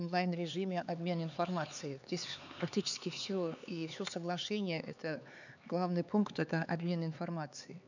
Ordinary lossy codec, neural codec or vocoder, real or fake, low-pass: none; codec, 16 kHz, 4 kbps, X-Codec, HuBERT features, trained on LibriSpeech; fake; 7.2 kHz